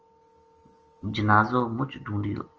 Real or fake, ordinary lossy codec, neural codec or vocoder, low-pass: real; Opus, 24 kbps; none; 7.2 kHz